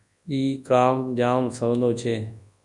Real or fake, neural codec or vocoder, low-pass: fake; codec, 24 kHz, 0.9 kbps, WavTokenizer, large speech release; 10.8 kHz